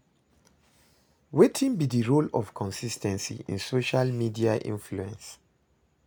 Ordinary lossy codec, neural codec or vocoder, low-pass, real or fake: none; none; none; real